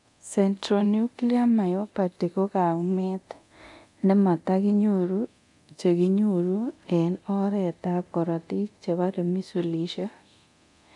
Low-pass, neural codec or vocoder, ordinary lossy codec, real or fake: 10.8 kHz; codec, 24 kHz, 0.9 kbps, DualCodec; none; fake